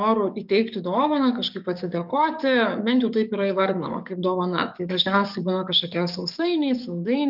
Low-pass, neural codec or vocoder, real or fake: 5.4 kHz; codec, 44.1 kHz, 7.8 kbps, DAC; fake